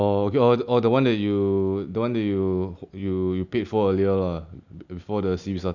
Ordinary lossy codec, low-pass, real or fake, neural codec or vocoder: none; 7.2 kHz; real; none